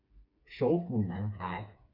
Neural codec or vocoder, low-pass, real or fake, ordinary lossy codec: codec, 16 kHz, 4 kbps, FreqCodec, smaller model; 5.4 kHz; fake; MP3, 48 kbps